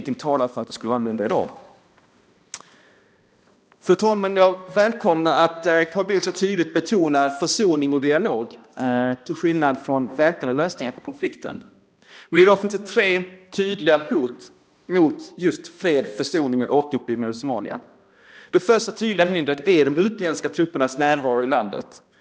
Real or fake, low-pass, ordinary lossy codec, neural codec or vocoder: fake; none; none; codec, 16 kHz, 1 kbps, X-Codec, HuBERT features, trained on balanced general audio